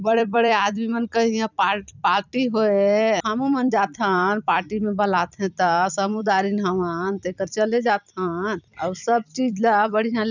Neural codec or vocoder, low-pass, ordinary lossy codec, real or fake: vocoder, 44.1 kHz, 128 mel bands every 256 samples, BigVGAN v2; 7.2 kHz; none; fake